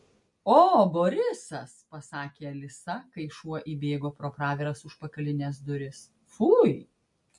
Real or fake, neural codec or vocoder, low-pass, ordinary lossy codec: real; none; 10.8 kHz; MP3, 48 kbps